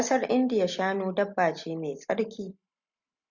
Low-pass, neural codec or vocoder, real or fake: 7.2 kHz; none; real